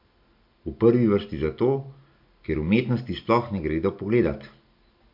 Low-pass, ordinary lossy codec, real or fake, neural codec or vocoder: 5.4 kHz; MP3, 48 kbps; real; none